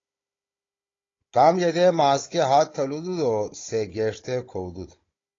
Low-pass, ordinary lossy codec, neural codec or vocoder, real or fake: 7.2 kHz; AAC, 32 kbps; codec, 16 kHz, 16 kbps, FunCodec, trained on Chinese and English, 50 frames a second; fake